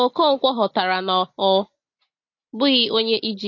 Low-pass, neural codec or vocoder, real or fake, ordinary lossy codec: 7.2 kHz; none; real; MP3, 32 kbps